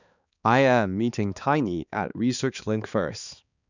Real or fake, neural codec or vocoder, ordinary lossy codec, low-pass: fake; codec, 16 kHz, 2 kbps, X-Codec, HuBERT features, trained on balanced general audio; none; 7.2 kHz